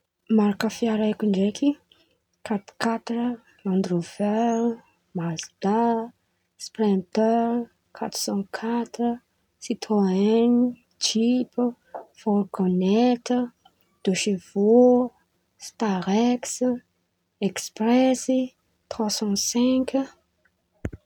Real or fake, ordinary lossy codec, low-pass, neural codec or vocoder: real; none; 19.8 kHz; none